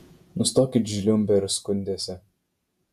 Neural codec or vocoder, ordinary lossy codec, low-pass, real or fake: vocoder, 48 kHz, 128 mel bands, Vocos; MP3, 96 kbps; 14.4 kHz; fake